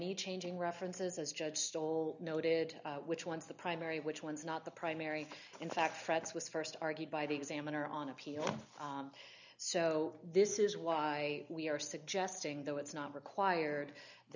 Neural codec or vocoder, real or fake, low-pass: none; real; 7.2 kHz